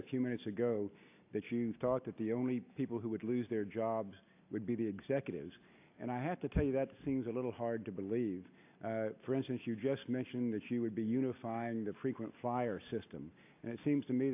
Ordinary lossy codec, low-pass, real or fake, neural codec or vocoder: AAC, 24 kbps; 3.6 kHz; real; none